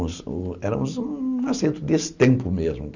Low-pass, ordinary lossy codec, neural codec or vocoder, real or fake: 7.2 kHz; none; none; real